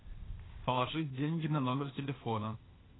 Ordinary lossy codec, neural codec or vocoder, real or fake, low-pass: AAC, 16 kbps; codec, 16 kHz, 0.8 kbps, ZipCodec; fake; 7.2 kHz